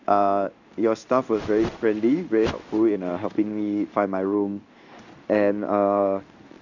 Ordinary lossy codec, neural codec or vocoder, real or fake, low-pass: none; codec, 16 kHz in and 24 kHz out, 1 kbps, XY-Tokenizer; fake; 7.2 kHz